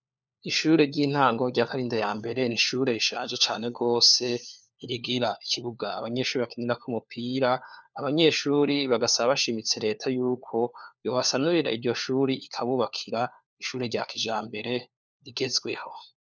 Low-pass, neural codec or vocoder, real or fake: 7.2 kHz; codec, 16 kHz, 4 kbps, FunCodec, trained on LibriTTS, 50 frames a second; fake